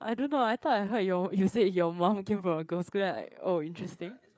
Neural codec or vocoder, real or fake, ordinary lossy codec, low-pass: codec, 16 kHz, 4 kbps, FreqCodec, larger model; fake; none; none